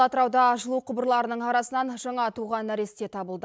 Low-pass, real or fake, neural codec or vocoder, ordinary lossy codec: none; real; none; none